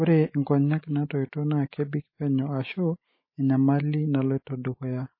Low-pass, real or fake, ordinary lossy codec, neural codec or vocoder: 5.4 kHz; real; MP3, 24 kbps; none